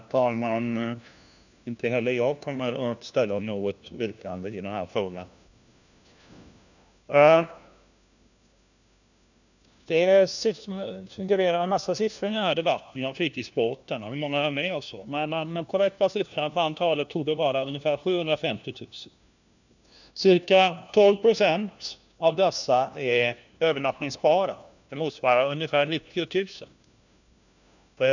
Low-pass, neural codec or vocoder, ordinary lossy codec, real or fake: 7.2 kHz; codec, 16 kHz, 1 kbps, FunCodec, trained on LibriTTS, 50 frames a second; none; fake